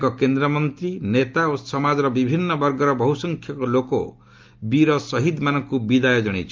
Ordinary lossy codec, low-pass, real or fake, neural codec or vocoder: Opus, 24 kbps; 7.2 kHz; real; none